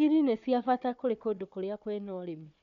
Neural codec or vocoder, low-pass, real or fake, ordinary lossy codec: codec, 16 kHz, 4 kbps, FunCodec, trained on Chinese and English, 50 frames a second; 7.2 kHz; fake; none